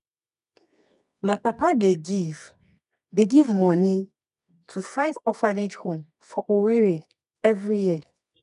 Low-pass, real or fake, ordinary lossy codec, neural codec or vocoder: 10.8 kHz; fake; none; codec, 24 kHz, 0.9 kbps, WavTokenizer, medium music audio release